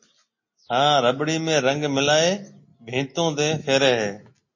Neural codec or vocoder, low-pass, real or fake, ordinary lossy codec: none; 7.2 kHz; real; MP3, 32 kbps